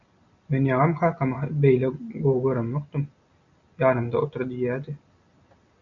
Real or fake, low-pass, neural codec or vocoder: real; 7.2 kHz; none